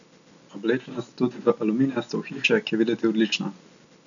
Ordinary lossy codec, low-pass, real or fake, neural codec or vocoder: none; 7.2 kHz; real; none